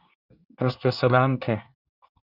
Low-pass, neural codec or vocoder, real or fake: 5.4 kHz; codec, 24 kHz, 1 kbps, SNAC; fake